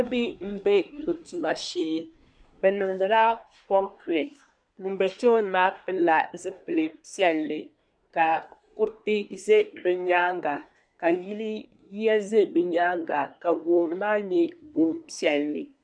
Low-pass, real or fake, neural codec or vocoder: 9.9 kHz; fake; codec, 24 kHz, 1 kbps, SNAC